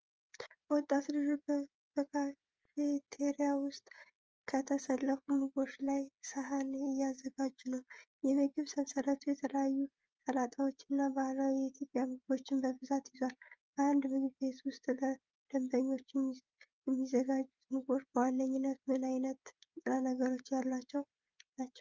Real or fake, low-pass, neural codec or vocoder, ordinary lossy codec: fake; 7.2 kHz; codec, 16 kHz, 16 kbps, FreqCodec, smaller model; Opus, 24 kbps